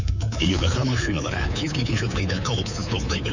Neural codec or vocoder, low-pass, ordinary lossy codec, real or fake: codec, 24 kHz, 3.1 kbps, DualCodec; 7.2 kHz; none; fake